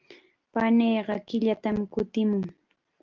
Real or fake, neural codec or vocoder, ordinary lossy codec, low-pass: real; none; Opus, 32 kbps; 7.2 kHz